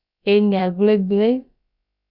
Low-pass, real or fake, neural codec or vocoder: 5.4 kHz; fake; codec, 16 kHz, about 1 kbps, DyCAST, with the encoder's durations